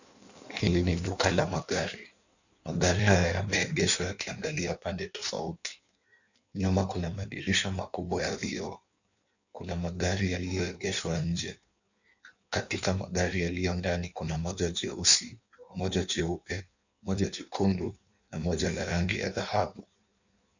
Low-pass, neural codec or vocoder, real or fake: 7.2 kHz; codec, 16 kHz in and 24 kHz out, 1.1 kbps, FireRedTTS-2 codec; fake